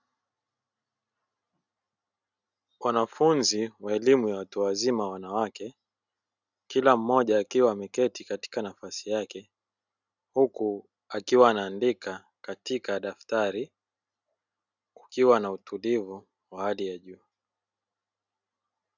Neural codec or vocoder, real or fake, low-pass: none; real; 7.2 kHz